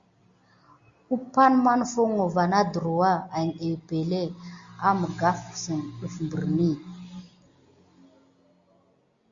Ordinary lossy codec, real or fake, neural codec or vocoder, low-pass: Opus, 64 kbps; real; none; 7.2 kHz